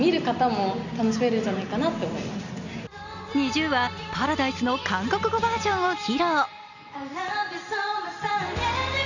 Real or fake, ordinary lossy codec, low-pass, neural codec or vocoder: real; none; 7.2 kHz; none